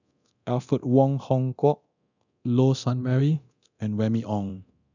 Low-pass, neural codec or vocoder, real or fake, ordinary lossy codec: 7.2 kHz; codec, 24 kHz, 0.9 kbps, DualCodec; fake; none